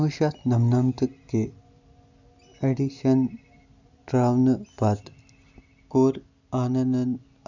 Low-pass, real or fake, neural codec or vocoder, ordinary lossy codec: 7.2 kHz; real; none; none